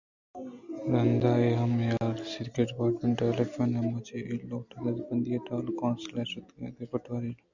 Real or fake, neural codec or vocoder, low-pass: real; none; 7.2 kHz